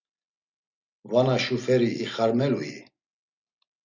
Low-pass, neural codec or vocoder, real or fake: 7.2 kHz; none; real